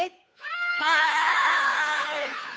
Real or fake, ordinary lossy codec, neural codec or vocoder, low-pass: fake; none; codec, 16 kHz, 2 kbps, FunCodec, trained on Chinese and English, 25 frames a second; none